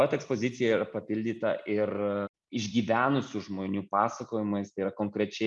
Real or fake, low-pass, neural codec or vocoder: real; 10.8 kHz; none